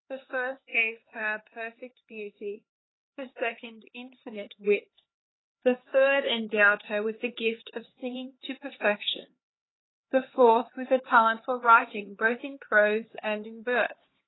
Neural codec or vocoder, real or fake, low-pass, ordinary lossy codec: codec, 16 kHz, 4 kbps, X-Codec, HuBERT features, trained on LibriSpeech; fake; 7.2 kHz; AAC, 16 kbps